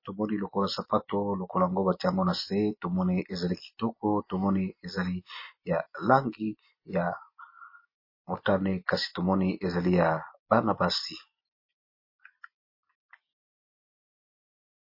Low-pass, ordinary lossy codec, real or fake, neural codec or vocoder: 5.4 kHz; MP3, 24 kbps; real; none